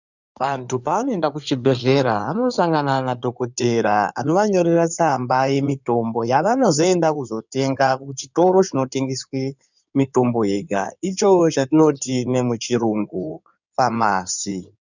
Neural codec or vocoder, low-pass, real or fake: codec, 16 kHz in and 24 kHz out, 2.2 kbps, FireRedTTS-2 codec; 7.2 kHz; fake